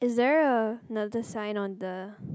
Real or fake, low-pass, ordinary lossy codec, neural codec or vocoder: real; none; none; none